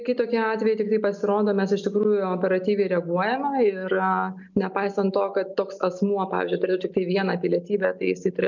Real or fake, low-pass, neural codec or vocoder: real; 7.2 kHz; none